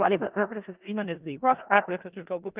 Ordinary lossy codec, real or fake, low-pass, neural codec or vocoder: Opus, 32 kbps; fake; 3.6 kHz; codec, 16 kHz in and 24 kHz out, 0.4 kbps, LongCat-Audio-Codec, four codebook decoder